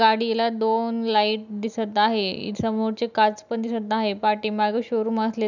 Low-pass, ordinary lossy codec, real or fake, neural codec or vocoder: 7.2 kHz; none; real; none